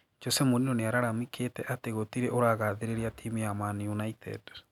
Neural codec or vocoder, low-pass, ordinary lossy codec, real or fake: none; 19.8 kHz; none; real